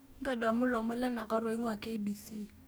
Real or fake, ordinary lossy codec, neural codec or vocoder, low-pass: fake; none; codec, 44.1 kHz, 2.6 kbps, DAC; none